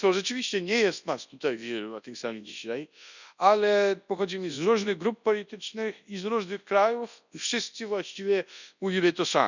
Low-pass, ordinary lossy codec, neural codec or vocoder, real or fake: 7.2 kHz; none; codec, 24 kHz, 0.9 kbps, WavTokenizer, large speech release; fake